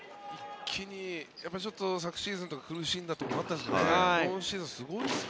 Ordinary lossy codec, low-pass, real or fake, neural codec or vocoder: none; none; real; none